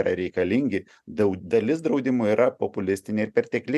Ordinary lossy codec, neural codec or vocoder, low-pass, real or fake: MP3, 96 kbps; none; 14.4 kHz; real